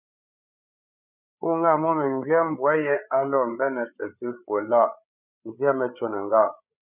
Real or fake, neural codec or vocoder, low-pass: fake; codec, 16 kHz, 8 kbps, FreqCodec, larger model; 3.6 kHz